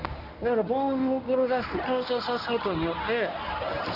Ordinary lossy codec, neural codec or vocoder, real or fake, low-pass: none; codec, 24 kHz, 0.9 kbps, WavTokenizer, medium speech release version 1; fake; 5.4 kHz